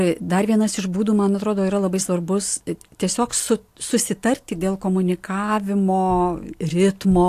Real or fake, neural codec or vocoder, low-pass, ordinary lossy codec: real; none; 14.4 kHz; AAC, 64 kbps